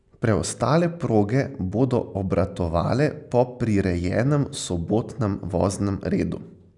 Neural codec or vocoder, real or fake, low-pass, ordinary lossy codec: none; real; 10.8 kHz; none